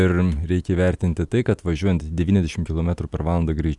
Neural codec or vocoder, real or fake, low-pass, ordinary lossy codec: none; real; 10.8 kHz; Opus, 64 kbps